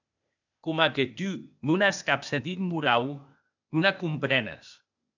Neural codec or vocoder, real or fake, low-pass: codec, 16 kHz, 0.8 kbps, ZipCodec; fake; 7.2 kHz